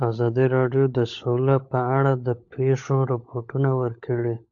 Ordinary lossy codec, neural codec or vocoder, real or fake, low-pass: AAC, 64 kbps; codec, 16 kHz, 16 kbps, FunCodec, trained on LibriTTS, 50 frames a second; fake; 7.2 kHz